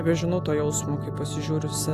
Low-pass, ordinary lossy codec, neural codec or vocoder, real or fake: 14.4 kHz; AAC, 64 kbps; none; real